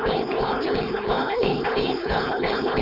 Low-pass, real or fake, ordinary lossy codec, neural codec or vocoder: 5.4 kHz; fake; none; codec, 16 kHz, 4.8 kbps, FACodec